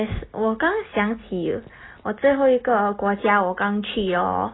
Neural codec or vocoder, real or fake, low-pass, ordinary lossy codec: none; real; 7.2 kHz; AAC, 16 kbps